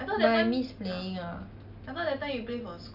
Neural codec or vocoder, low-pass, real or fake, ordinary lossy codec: none; 5.4 kHz; real; none